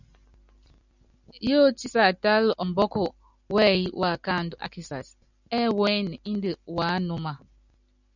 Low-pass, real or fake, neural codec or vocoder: 7.2 kHz; real; none